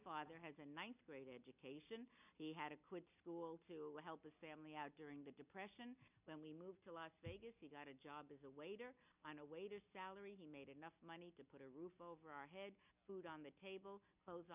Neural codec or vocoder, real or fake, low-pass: none; real; 3.6 kHz